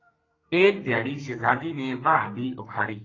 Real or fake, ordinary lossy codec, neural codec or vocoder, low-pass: fake; AAC, 32 kbps; codec, 32 kHz, 1.9 kbps, SNAC; 7.2 kHz